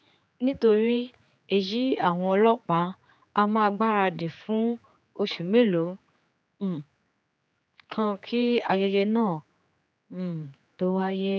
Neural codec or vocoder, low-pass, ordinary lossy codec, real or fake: codec, 16 kHz, 4 kbps, X-Codec, HuBERT features, trained on general audio; none; none; fake